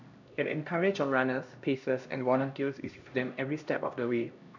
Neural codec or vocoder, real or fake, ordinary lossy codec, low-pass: codec, 16 kHz, 1 kbps, X-Codec, HuBERT features, trained on LibriSpeech; fake; none; 7.2 kHz